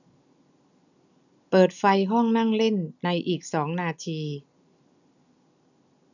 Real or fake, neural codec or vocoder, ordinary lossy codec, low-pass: real; none; none; 7.2 kHz